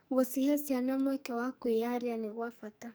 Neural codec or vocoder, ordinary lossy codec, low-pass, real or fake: codec, 44.1 kHz, 2.6 kbps, SNAC; none; none; fake